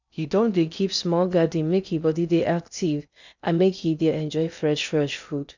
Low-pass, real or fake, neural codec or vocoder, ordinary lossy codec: 7.2 kHz; fake; codec, 16 kHz in and 24 kHz out, 0.6 kbps, FocalCodec, streaming, 2048 codes; none